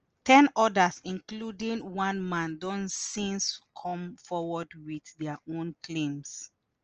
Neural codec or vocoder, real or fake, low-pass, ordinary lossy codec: none; real; 7.2 kHz; Opus, 24 kbps